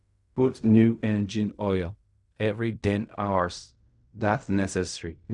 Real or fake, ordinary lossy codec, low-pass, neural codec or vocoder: fake; none; 10.8 kHz; codec, 16 kHz in and 24 kHz out, 0.4 kbps, LongCat-Audio-Codec, fine tuned four codebook decoder